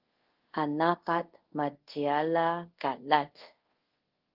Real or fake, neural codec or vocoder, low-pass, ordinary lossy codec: fake; codec, 24 kHz, 0.5 kbps, DualCodec; 5.4 kHz; Opus, 16 kbps